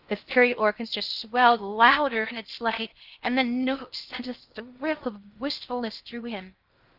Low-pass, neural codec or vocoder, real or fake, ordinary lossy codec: 5.4 kHz; codec, 16 kHz in and 24 kHz out, 0.6 kbps, FocalCodec, streaming, 4096 codes; fake; Opus, 24 kbps